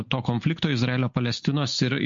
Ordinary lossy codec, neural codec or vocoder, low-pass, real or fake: MP3, 48 kbps; none; 7.2 kHz; real